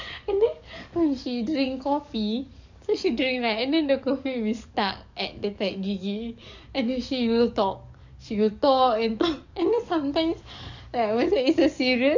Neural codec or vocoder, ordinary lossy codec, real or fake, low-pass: codec, 44.1 kHz, 7.8 kbps, DAC; none; fake; 7.2 kHz